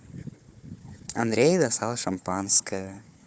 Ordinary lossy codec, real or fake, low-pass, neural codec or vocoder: none; fake; none; codec, 16 kHz, 4 kbps, FunCodec, trained on Chinese and English, 50 frames a second